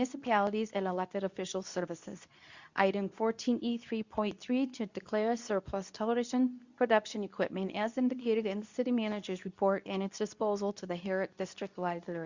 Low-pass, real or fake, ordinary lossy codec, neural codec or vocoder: 7.2 kHz; fake; Opus, 64 kbps; codec, 24 kHz, 0.9 kbps, WavTokenizer, medium speech release version 2